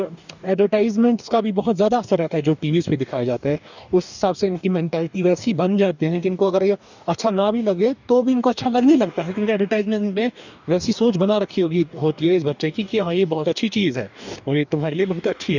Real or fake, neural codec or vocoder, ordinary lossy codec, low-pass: fake; codec, 44.1 kHz, 2.6 kbps, DAC; none; 7.2 kHz